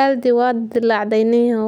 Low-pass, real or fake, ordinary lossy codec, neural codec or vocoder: 19.8 kHz; real; none; none